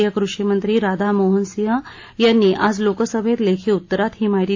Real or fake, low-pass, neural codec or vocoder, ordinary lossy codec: real; 7.2 kHz; none; AAC, 48 kbps